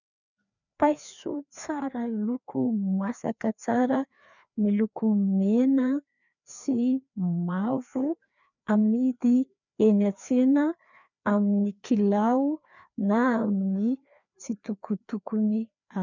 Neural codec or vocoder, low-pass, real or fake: codec, 16 kHz, 2 kbps, FreqCodec, larger model; 7.2 kHz; fake